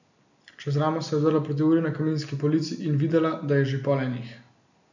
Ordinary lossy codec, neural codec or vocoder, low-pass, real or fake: none; none; 7.2 kHz; real